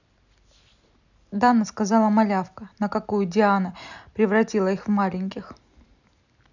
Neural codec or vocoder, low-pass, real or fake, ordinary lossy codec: none; 7.2 kHz; real; none